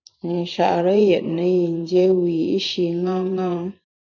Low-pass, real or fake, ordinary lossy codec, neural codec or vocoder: 7.2 kHz; fake; MP3, 64 kbps; vocoder, 44.1 kHz, 128 mel bands every 512 samples, BigVGAN v2